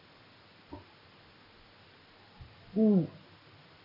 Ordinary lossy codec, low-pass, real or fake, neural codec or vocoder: none; 5.4 kHz; real; none